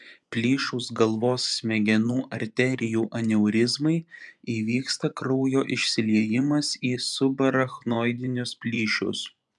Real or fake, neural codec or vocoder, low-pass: fake; vocoder, 24 kHz, 100 mel bands, Vocos; 10.8 kHz